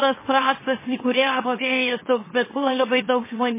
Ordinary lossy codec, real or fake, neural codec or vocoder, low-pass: MP3, 16 kbps; fake; autoencoder, 44.1 kHz, a latent of 192 numbers a frame, MeloTTS; 3.6 kHz